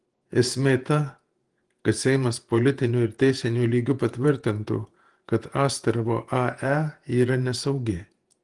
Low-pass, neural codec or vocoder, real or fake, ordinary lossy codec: 10.8 kHz; vocoder, 48 kHz, 128 mel bands, Vocos; fake; Opus, 24 kbps